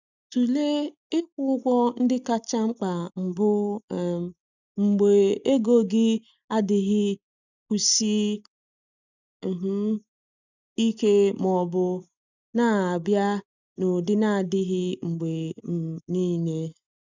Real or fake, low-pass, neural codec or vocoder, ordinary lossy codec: real; 7.2 kHz; none; none